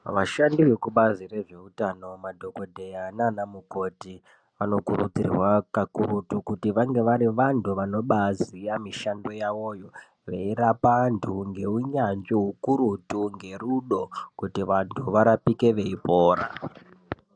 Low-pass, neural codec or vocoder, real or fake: 9.9 kHz; none; real